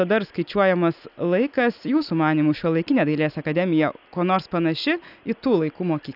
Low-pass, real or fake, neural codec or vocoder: 5.4 kHz; real; none